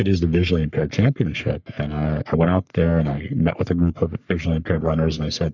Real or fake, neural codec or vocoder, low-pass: fake; codec, 44.1 kHz, 3.4 kbps, Pupu-Codec; 7.2 kHz